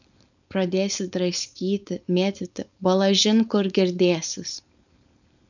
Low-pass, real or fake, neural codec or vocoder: 7.2 kHz; fake; codec, 16 kHz, 4.8 kbps, FACodec